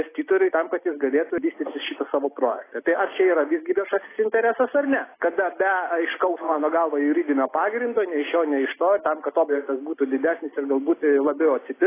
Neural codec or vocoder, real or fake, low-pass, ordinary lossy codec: none; real; 3.6 kHz; AAC, 16 kbps